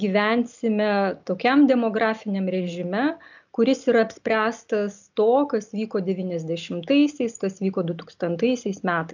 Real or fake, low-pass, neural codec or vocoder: real; 7.2 kHz; none